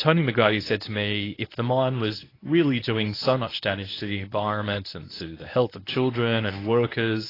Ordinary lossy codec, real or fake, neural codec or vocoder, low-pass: AAC, 24 kbps; fake; codec, 24 kHz, 0.9 kbps, WavTokenizer, medium speech release version 1; 5.4 kHz